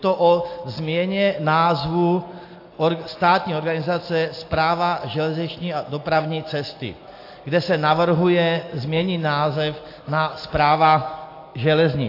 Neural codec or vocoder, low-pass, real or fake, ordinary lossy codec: none; 5.4 kHz; real; AAC, 32 kbps